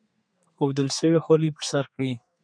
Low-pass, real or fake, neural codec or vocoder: 9.9 kHz; fake; codec, 32 kHz, 1.9 kbps, SNAC